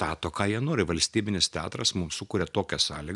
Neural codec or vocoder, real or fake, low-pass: none; real; 10.8 kHz